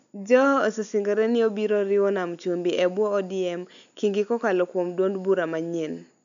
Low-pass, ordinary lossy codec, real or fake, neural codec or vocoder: 7.2 kHz; none; real; none